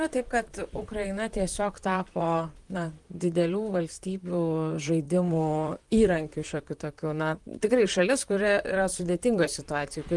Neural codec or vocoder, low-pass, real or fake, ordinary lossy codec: vocoder, 44.1 kHz, 128 mel bands, Pupu-Vocoder; 10.8 kHz; fake; Opus, 24 kbps